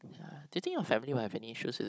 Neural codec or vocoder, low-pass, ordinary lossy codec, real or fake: codec, 16 kHz, 16 kbps, FunCodec, trained on Chinese and English, 50 frames a second; none; none; fake